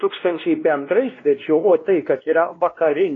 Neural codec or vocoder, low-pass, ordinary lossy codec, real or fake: codec, 16 kHz, 1 kbps, X-Codec, WavLM features, trained on Multilingual LibriSpeech; 7.2 kHz; AAC, 32 kbps; fake